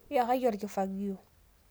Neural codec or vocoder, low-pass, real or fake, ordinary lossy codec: none; none; real; none